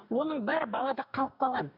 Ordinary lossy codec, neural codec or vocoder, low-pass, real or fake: none; codec, 44.1 kHz, 2.6 kbps, DAC; 5.4 kHz; fake